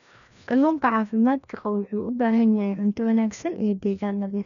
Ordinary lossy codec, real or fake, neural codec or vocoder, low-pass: none; fake; codec, 16 kHz, 1 kbps, FreqCodec, larger model; 7.2 kHz